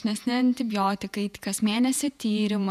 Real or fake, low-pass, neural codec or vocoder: fake; 14.4 kHz; vocoder, 48 kHz, 128 mel bands, Vocos